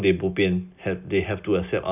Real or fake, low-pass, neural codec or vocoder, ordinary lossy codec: real; 3.6 kHz; none; none